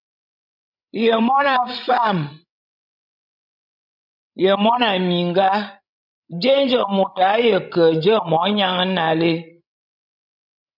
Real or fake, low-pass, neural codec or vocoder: fake; 5.4 kHz; codec, 16 kHz, 16 kbps, FreqCodec, larger model